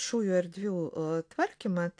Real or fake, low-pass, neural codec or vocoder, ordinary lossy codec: real; 9.9 kHz; none; AAC, 48 kbps